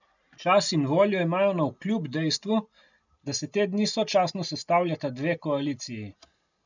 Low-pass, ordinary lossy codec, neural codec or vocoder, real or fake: 7.2 kHz; none; none; real